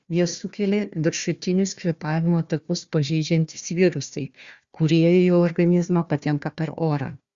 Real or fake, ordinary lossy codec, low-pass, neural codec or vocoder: fake; Opus, 64 kbps; 7.2 kHz; codec, 16 kHz, 1 kbps, FunCodec, trained on Chinese and English, 50 frames a second